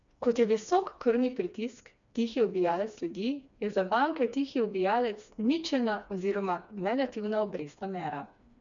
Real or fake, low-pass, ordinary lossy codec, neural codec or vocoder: fake; 7.2 kHz; none; codec, 16 kHz, 2 kbps, FreqCodec, smaller model